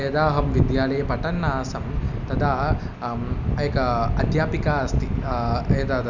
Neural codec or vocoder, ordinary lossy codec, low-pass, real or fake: none; none; 7.2 kHz; real